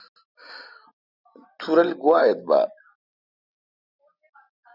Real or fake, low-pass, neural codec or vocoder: real; 5.4 kHz; none